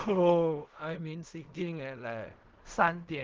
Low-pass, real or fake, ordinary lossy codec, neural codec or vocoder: 7.2 kHz; fake; Opus, 16 kbps; codec, 16 kHz in and 24 kHz out, 0.4 kbps, LongCat-Audio-Codec, fine tuned four codebook decoder